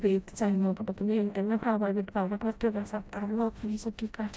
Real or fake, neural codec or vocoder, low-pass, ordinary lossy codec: fake; codec, 16 kHz, 0.5 kbps, FreqCodec, smaller model; none; none